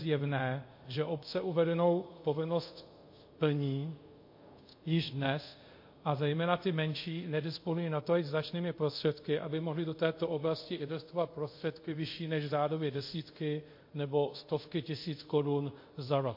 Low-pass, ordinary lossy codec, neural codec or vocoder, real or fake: 5.4 kHz; MP3, 32 kbps; codec, 24 kHz, 0.5 kbps, DualCodec; fake